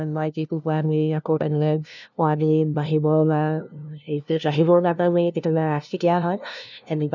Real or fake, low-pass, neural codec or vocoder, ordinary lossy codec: fake; 7.2 kHz; codec, 16 kHz, 0.5 kbps, FunCodec, trained on LibriTTS, 25 frames a second; none